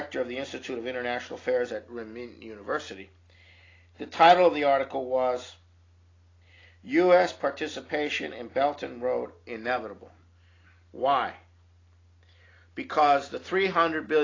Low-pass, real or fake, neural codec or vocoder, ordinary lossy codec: 7.2 kHz; real; none; AAC, 32 kbps